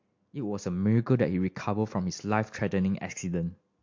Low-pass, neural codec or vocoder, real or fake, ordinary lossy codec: 7.2 kHz; none; real; MP3, 48 kbps